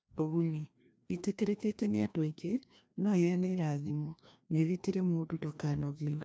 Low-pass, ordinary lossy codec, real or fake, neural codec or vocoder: none; none; fake; codec, 16 kHz, 1 kbps, FreqCodec, larger model